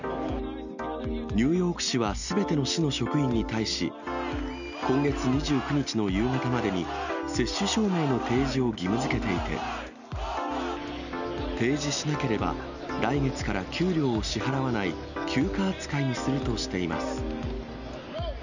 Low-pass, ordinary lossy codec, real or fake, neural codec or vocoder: 7.2 kHz; none; real; none